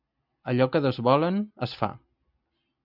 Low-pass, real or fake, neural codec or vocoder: 5.4 kHz; real; none